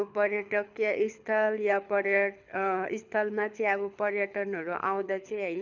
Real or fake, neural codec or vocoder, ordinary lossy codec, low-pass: fake; codec, 24 kHz, 6 kbps, HILCodec; none; 7.2 kHz